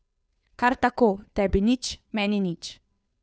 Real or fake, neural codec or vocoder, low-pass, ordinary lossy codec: fake; codec, 16 kHz, 2 kbps, FunCodec, trained on Chinese and English, 25 frames a second; none; none